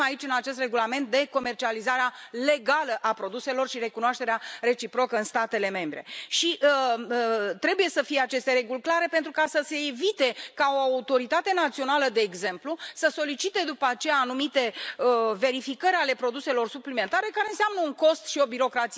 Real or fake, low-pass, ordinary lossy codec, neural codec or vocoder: real; none; none; none